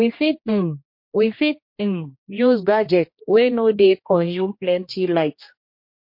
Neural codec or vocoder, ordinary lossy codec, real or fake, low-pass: codec, 16 kHz, 1 kbps, X-Codec, HuBERT features, trained on general audio; MP3, 32 kbps; fake; 5.4 kHz